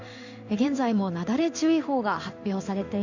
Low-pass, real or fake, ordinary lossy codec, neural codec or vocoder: 7.2 kHz; fake; none; codec, 16 kHz in and 24 kHz out, 1 kbps, XY-Tokenizer